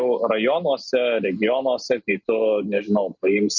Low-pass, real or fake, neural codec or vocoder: 7.2 kHz; real; none